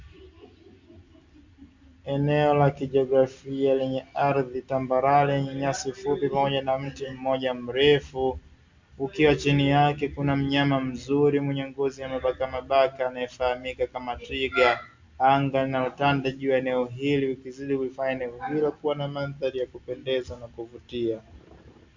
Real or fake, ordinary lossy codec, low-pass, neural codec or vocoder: real; MP3, 64 kbps; 7.2 kHz; none